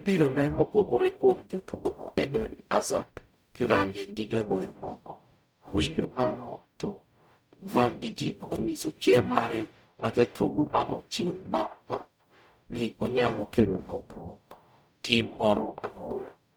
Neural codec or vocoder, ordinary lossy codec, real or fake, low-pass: codec, 44.1 kHz, 0.9 kbps, DAC; none; fake; none